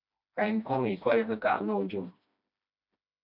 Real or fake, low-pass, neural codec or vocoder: fake; 5.4 kHz; codec, 16 kHz, 1 kbps, FreqCodec, smaller model